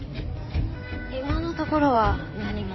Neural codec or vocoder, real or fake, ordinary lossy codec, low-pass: codec, 16 kHz in and 24 kHz out, 2.2 kbps, FireRedTTS-2 codec; fake; MP3, 24 kbps; 7.2 kHz